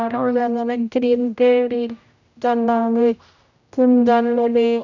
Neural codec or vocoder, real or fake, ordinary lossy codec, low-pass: codec, 16 kHz, 0.5 kbps, X-Codec, HuBERT features, trained on general audio; fake; none; 7.2 kHz